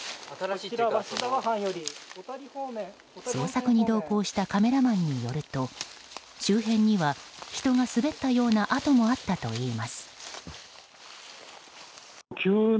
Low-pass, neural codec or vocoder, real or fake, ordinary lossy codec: none; none; real; none